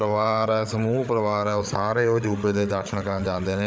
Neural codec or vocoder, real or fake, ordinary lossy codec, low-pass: codec, 16 kHz, 8 kbps, FreqCodec, larger model; fake; none; none